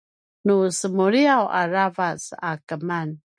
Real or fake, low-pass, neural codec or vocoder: real; 9.9 kHz; none